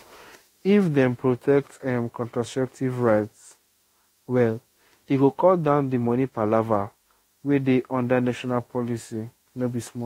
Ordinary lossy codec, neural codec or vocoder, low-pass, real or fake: AAC, 48 kbps; autoencoder, 48 kHz, 32 numbers a frame, DAC-VAE, trained on Japanese speech; 19.8 kHz; fake